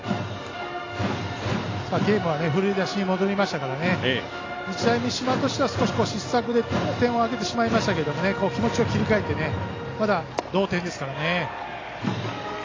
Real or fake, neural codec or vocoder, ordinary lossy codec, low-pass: real; none; AAC, 32 kbps; 7.2 kHz